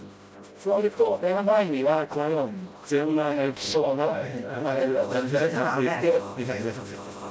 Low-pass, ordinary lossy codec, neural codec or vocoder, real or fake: none; none; codec, 16 kHz, 0.5 kbps, FreqCodec, smaller model; fake